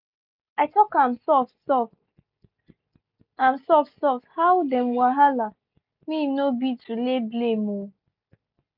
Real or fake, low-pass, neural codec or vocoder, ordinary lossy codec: real; 5.4 kHz; none; none